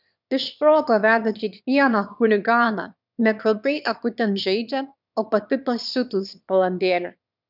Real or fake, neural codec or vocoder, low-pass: fake; autoencoder, 22.05 kHz, a latent of 192 numbers a frame, VITS, trained on one speaker; 5.4 kHz